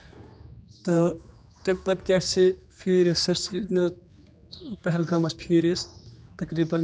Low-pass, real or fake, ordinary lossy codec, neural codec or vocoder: none; fake; none; codec, 16 kHz, 2 kbps, X-Codec, HuBERT features, trained on general audio